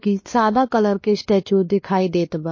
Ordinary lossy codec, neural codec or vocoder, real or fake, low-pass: MP3, 32 kbps; codec, 16 kHz, 2 kbps, X-Codec, WavLM features, trained on Multilingual LibriSpeech; fake; 7.2 kHz